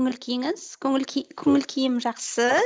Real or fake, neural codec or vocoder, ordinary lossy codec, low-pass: real; none; none; none